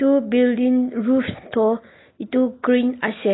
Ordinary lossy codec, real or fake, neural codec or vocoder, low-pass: AAC, 16 kbps; real; none; 7.2 kHz